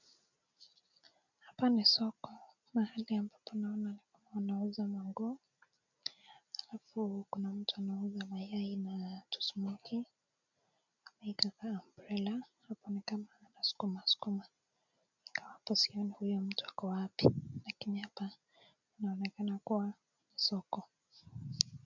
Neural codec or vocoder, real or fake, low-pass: none; real; 7.2 kHz